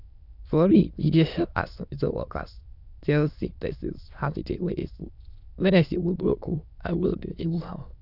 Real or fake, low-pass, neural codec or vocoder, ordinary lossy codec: fake; 5.4 kHz; autoencoder, 22.05 kHz, a latent of 192 numbers a frame, VITS, trained on many speakers; none